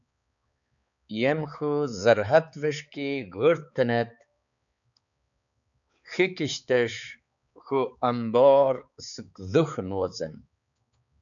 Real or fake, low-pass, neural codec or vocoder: fake; 7.2 kHz; codec, 16 kHz, 4 kbps, X-Codec, HuBERT features, trained on balanced general audio